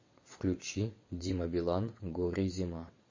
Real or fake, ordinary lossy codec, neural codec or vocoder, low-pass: fake; MP3, 32 kbps; vocoder, 22.05 kHz, 80 mel bands, WaveNeXt; 7.2 kHz